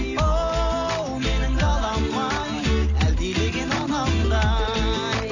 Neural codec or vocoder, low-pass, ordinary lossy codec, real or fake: none; 7.2 kHz; none; real